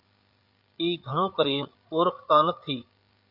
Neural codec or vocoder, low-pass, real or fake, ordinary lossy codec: codec, 16 kHz, 8 kbps, FreqCodec, larger model; 5.4 kHz; fake; Opus, 64 kbps